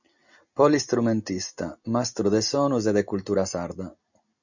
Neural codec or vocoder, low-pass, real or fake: none; 7.2 kHz; real